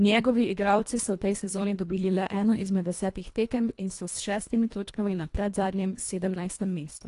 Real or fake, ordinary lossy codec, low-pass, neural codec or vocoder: fake; AAC, 48 kbps; 10.8 kHz; codec, 24 kHz, 1.5 kbps, HILCodec